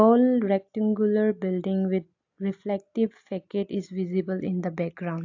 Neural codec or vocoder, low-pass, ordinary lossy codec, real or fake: none; 7.2 kHz; AAC, 48 kbps; real